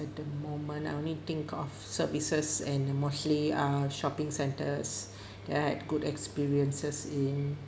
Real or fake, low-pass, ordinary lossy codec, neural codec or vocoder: real; none; none; none